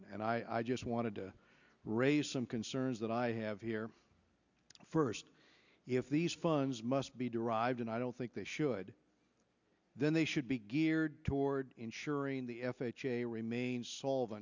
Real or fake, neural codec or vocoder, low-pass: real; none; 7.2 kHz